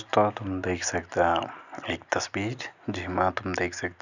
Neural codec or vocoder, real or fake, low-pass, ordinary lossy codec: none; real; 7.2 kHz; none